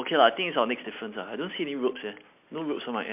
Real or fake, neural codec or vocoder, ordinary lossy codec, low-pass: real; none; MP3, 32 kbps; 3.6 kHz